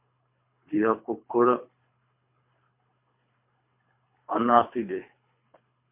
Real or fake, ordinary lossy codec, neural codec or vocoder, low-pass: fake; MP3, 32 kbps; codec, 24 kHz, 3 kbps, HILCodec; 3.6 kHz